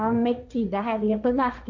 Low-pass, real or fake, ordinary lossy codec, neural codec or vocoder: 7.2 kHz; fake; none; codec, 16 kHz, 1.1 kbps, Voila-Tokenizer